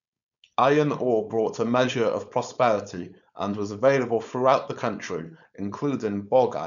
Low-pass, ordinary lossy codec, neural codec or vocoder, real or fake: 7.2 kHz; none; codec, 16 kHz, 4.8 kbps, FACodec; fake